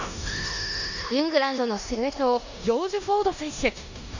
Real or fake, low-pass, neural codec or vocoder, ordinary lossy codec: fake; 7.2 kHz; codec, 16 kHz in and 24 kHz out, 0.9 kbps, LongCat-Audio-Codec, four codebook decoder; none